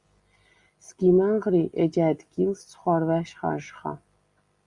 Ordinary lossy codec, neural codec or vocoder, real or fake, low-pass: Opus, 64 kbps; none; real; 10.8 kHz